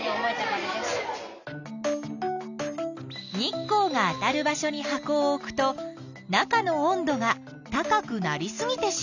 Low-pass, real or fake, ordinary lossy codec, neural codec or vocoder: 7.2 kHz; real; none; none